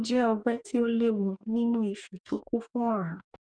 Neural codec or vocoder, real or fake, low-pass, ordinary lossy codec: codec, 44.1 kHz, 2.6 kbps, DAC; fake; 9.9 kHz; none